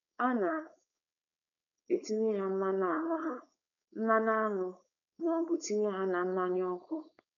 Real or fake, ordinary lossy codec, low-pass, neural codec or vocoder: fake; none; 7.2 kHz; codec, 16 kHz, 4.8 kbps, FACodec